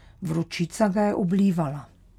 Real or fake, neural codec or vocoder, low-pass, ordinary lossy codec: real; none; 19.8 kHz; none